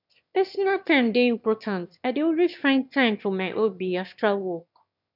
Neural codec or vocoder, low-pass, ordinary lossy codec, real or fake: autoencoder, 22.05 kHz, a latent of 192 numbers a frame, VITS, trained on one speaker; 5.4 kHz; none; fake